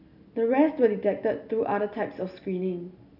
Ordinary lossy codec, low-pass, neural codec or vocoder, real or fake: none; 5.4 kHz; none; real